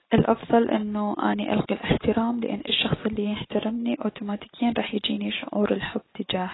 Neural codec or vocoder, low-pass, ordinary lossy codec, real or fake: none; 7.2 kHz; AAC, 16 kbps; real